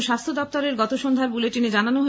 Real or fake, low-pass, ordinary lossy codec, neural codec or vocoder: real; none; none; none